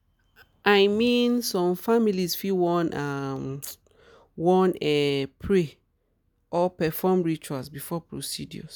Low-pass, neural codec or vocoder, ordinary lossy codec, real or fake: none; none; none; real